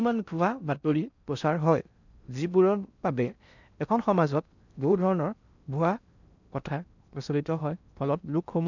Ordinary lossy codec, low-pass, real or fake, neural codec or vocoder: none; 7.2 kHz; fake; codec, 16 kHz in and 24 kHz out, 0.6 kbps, FocalCodec, streaming, 4096 codes